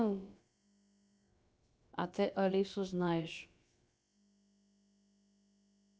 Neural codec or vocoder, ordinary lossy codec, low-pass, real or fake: codec, 16 kHz, about 1 kbps, DyCAST, with the encoder's durations; none; none; fake